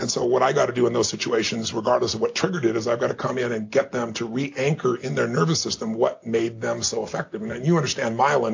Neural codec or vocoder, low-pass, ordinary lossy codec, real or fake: none; 7.2 kHz; AAC, 48 kbps; real